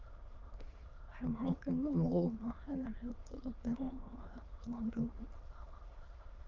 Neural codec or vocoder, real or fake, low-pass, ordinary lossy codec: autoencoder, 22.05 kHz, a latent of 192 numbers a frame, VITS, trained on many speakers; fake; 7.2 kHz; Opus, 24 kbps